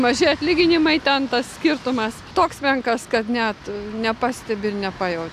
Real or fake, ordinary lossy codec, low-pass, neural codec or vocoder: real; AAC, 96 kbps; 14.4 kHz; none